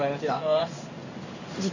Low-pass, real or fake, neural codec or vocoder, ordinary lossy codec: 7.2 kHz; fake; codec, 16 kHz in and 24 kHz out, 1 kbps, XY-Tokenizer; none